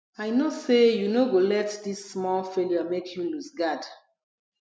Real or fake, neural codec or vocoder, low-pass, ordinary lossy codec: real; none; none; none